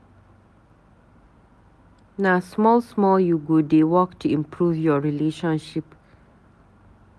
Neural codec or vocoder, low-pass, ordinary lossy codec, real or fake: none; none; none; real